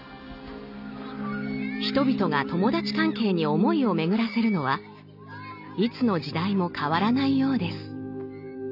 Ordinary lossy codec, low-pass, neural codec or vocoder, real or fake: none; 5.4 kHz; none; real